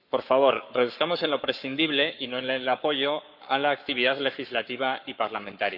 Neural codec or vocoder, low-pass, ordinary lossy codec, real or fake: codec, 44.1 kHz, 7.8 kbps, Pupu-Codec; 5.4 kHz; none; fake